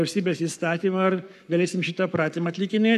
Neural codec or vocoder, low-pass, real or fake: codec, 44.1 kHz, 7.8 kbps, Pupu-Codec; 14.4 kHz; fake